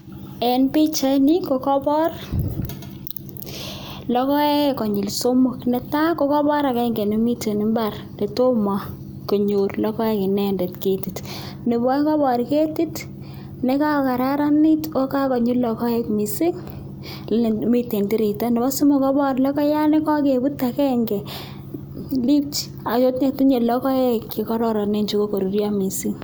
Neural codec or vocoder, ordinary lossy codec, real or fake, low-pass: none; none; real; none